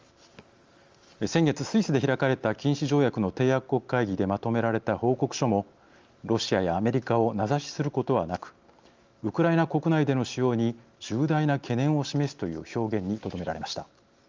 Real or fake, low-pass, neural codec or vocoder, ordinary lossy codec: real; 7.2 kHz; none; Opus, 32 kbps